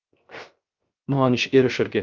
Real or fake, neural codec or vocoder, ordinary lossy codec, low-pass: fake; codec, 16 kHz, 0.3 kbps, FocalCodec; Opus, 24 kbps; 7.2 kHz